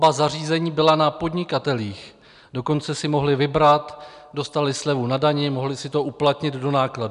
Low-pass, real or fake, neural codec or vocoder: 10.8 kHz; real; none